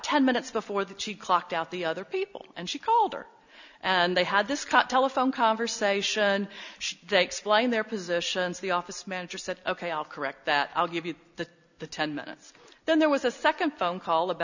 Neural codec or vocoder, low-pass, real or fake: none; 7.2 kHz; real